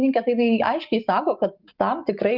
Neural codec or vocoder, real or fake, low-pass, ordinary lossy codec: none; real; 5.4 kHz; Opus, 32 kbps